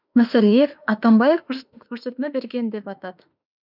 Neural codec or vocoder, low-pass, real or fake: autoencoder, 48 kHz, 32 numbers a frame, DAC-VAE, trained on Japanese speech; 5.4 kHz; fake